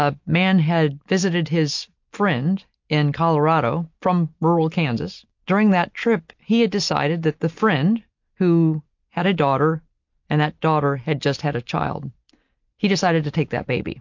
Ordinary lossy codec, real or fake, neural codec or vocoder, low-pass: MP3, 48 kbps; real; none; 7.2 kHz